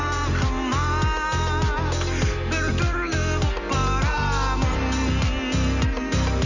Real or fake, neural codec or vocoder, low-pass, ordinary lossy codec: real; none; 7.2 kHz; none